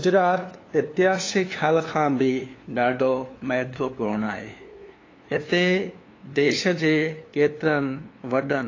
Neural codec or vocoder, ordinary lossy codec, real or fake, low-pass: codec, 16 kHz, 2 kbps, FunCodec, trained on LibriTTS, 25 frames a second; AAC, 32 kbps; fake; 7.2 kHz